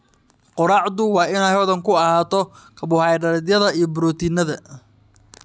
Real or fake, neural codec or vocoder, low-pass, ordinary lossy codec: real; none; none; none